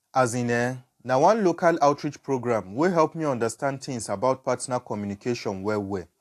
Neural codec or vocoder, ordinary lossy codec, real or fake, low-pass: none; AAC, 64 kbps; real; 14.4 kHz